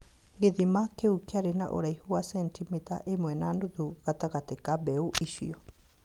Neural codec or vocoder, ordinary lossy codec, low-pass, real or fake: none; Opus, 32 kbps; 19.8 kHz; real